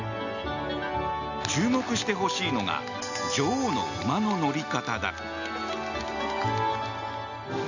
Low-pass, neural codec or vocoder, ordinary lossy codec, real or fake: 7.2 kHz; none; none; real